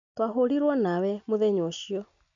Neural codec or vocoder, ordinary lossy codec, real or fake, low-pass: none; none; real; 7.2 kHz